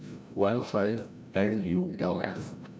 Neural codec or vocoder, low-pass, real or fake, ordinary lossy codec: codec, 16 kHz, 0.5 kbps, FreqCodec, larger model; none; fake; none